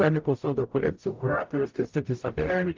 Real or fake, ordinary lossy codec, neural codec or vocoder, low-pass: fake; Opus, 32 kbps; codec, 44.1 kHz, 0.9 kbps, DAC; 7.2 kHz